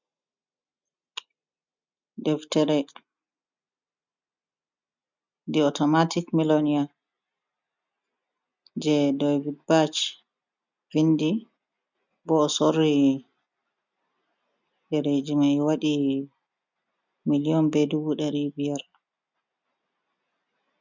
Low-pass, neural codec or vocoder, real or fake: 7.2 kHz; none; real